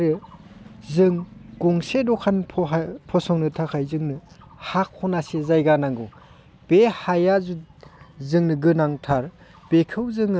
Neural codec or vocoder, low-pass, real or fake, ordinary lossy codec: none; none; real; none